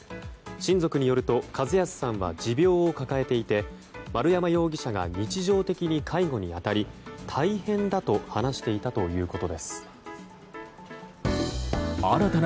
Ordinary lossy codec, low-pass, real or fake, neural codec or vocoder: none; none; real; none